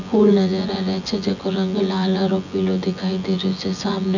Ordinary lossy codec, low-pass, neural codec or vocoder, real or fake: none; 7.2 kHz; vocoder, 24 kHz, 100 mel bands, Vocos; fake